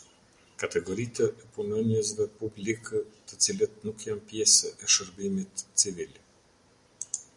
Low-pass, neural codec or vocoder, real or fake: 10.8 kHz; none; real